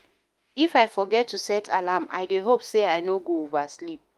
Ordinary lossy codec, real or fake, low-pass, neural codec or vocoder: Opus, 32 kbps; fake; 14.4 kHz; autoencoder, 48 kHz, 32 numbers a frame, DAC-VAE, trained on Japanese speech